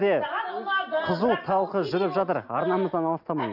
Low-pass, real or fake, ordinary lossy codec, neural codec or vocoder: 5.4 kHz; real; none; none